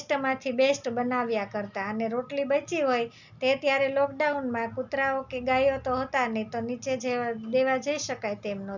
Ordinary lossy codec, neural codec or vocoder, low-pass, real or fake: none; none; 7.2 kHz; real